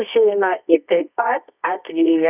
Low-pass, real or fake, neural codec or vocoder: 3.6 kHz; fake; codec, 24 kHz, 0.9 kbps, WavTokenizer, medium music audio release